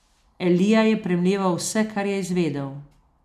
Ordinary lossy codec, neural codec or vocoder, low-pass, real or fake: none; none; 14.4 kHz; real